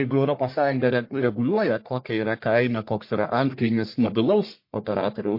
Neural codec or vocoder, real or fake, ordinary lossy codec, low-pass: codec, 44.1 kHz, 1.7 kbps, Pupu-Codec; fake; MP3, 32 kbps; 5.4 kHz